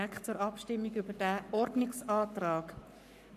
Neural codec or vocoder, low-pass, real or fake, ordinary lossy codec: codec, 44.1 kHz, 7.8 kbps, Pupu-Codec; 14.4 kHz; fake; none